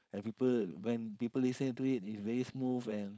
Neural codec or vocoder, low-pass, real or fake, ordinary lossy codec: codec, 16 kHz, 4.8 kbps, FACodec; none; fake; none